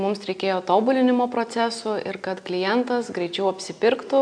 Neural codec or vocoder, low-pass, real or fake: none; 9.9 kHz; real